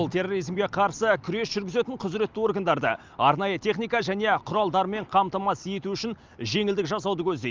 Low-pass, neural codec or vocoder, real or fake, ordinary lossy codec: 7.2 kHz; none; real; Opus, 24 kbps